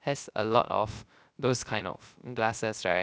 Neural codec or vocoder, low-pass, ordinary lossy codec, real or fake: codec, 16 kHz, 0.3 kbps, FocalCodec; none; none; fake